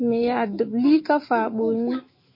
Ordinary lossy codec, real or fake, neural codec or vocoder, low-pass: MP3, 24 kbps; fake; autoencoder, 48 kHz, 128 numbers a frame, DAC-VAE, trained on Japanese speech; 5.4 kHz